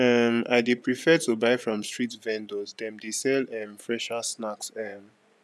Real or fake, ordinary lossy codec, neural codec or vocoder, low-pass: fake; none; vocoder, 24 kHz, 100 mel bands, Vocos; none